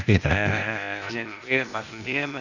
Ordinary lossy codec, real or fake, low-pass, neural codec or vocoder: none; fake; 7.2 kHz; codec, 16 kHz, 0.8 kbps, ZipCodec